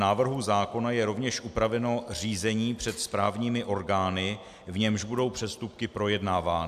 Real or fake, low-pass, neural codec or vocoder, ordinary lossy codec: real; 14.4 kHz; none; AAC, 96 kbps